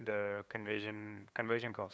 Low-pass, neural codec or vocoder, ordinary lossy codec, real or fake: none; codec, 16 kHz, 2 kbps, FunCodec, trained on LibriTTS, 25 frames a second; none; fake